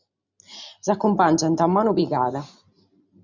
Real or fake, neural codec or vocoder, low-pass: real; none; 7.2 kHz